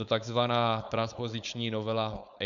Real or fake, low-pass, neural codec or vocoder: fake; 7.2 kHz; codec, 16 kHz, 4.8 kbps, FACodec